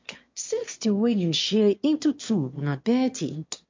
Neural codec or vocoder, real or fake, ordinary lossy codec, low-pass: autoencoder, 22.05 kHz, a latent of 192 numbers a frame, VITS, trained on one speaker; fake; MP3, 48 kbps; 7.2 kHz